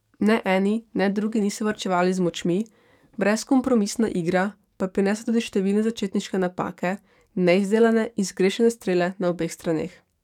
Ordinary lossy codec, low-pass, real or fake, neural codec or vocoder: none; 19.8 kHz; fake; codec, 44.1 kHz, 7.8 kbps, DAC